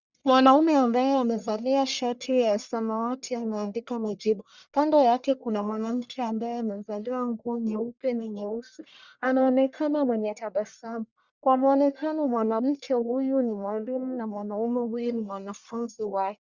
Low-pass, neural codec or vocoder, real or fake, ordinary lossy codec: 7.2 kHz; codec, 44.1 kHz, 1.7 kbps, Pupu-Codec; fake; Opus, 64 kbps